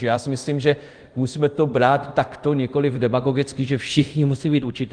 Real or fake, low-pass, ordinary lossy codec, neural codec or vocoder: fake; 9.9 kHz; Opus, 24 kbps; codec, 24 kHz, 0.5 kbps, DualCodec